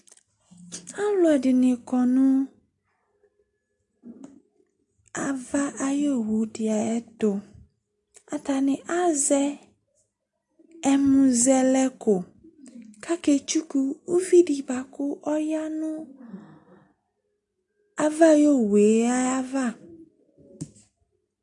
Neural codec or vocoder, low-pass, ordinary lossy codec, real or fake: none; 10.8 kHz; AAC, 64 kbps; real